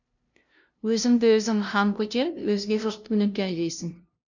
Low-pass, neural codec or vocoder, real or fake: 7.2 kHz; codec, 16 kHz, 0.5 kbps, FunCodec, trained on LibriTTS, 25 frames a second; fake